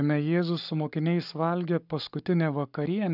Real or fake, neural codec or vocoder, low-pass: fake; codec, 44.1 kHz, 7.8 kbps, Pupu-Codec; 5.4 kHz